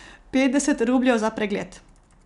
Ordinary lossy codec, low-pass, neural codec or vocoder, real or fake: none; 10.8 kHz; none; real